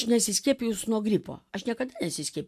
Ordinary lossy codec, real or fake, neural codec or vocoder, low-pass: AAC, 96 kbps; real; none; 14.4 kHz